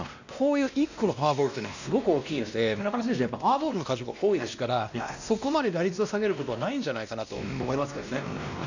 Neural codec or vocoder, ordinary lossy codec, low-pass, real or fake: codec, 16 kHz, 1 kbps, X-Codec, WavLM features, trained on Multilingual LibriSpeech; none; 7.2 kHz; fake